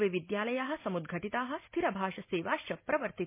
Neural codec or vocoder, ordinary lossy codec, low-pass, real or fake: none; MP3, 24 kbps; 3.6 kHz; real